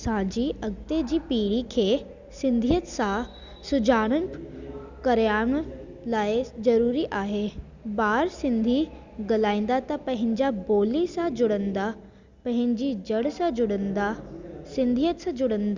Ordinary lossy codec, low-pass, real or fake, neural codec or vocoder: Opus, 64 kbps; 7.2 kHz; real; none